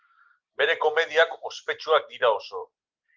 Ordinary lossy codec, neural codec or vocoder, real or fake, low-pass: Opus, 32 kbps; none; real; 7.2 kHz